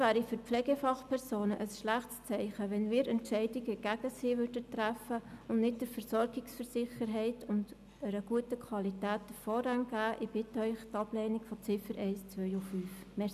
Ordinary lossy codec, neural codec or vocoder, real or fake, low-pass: none; none; real; 14.4 kHz